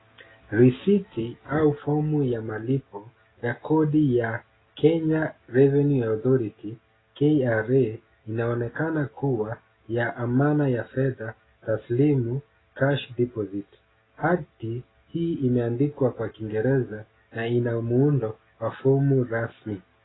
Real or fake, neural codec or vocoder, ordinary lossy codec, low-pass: real; none; AAC, 16 kbps; 7.2 kHz